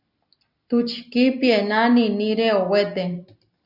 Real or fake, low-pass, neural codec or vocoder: real; 5.4 kHz; none